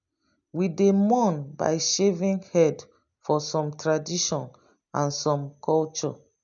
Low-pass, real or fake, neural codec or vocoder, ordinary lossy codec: 7.2 kHz; real; none; none